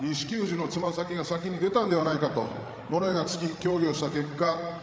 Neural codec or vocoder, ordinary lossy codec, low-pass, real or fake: codec, 16 kHz, 8 kbps, FreqCodec, larger model; none; none; fake